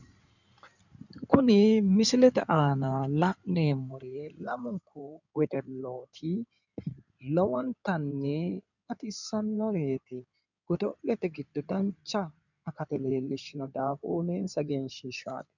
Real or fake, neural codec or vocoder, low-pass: fake; codec, 16 kHz in and 24 kHz out, 2.2 kbps, FireRedTTS-2 codec; 7.2 kHz